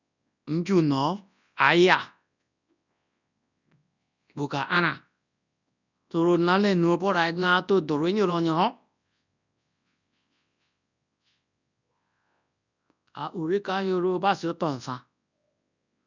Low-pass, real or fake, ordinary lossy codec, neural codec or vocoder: 7.2 kHz; fake; none; codec, 24 kHz, 0.9 kbps, WavTokenizer, large speech release